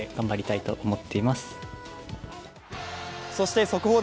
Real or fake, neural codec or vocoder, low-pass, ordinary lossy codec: real; none; none; none